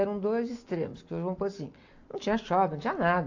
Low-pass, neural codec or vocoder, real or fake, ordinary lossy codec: 7.2 kHz; none; real; AAC, 48 kbps